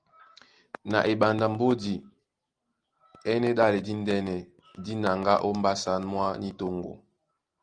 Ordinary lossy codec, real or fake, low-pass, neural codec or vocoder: Opus, 24 kbps; real; 9.9 kHz; none